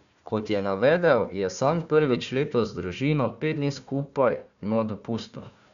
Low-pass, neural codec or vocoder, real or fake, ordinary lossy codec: 7.2 kHz; codec, 16 kHz, 1 kbps, FunCodec, trained on Chinese and English, 50 frames a second; fake; MP3, 96 kbps